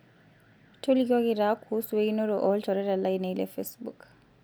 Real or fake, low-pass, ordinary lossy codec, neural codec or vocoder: real; none; none; none